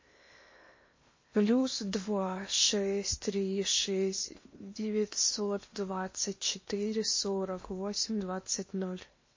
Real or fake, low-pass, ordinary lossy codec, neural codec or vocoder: fake; 7.2 kHz; MP3, 32 kbps; codec, 16 kHz in and 24 kHz out, 0.8 kbps, FocalCodec, streaming, 65536 codes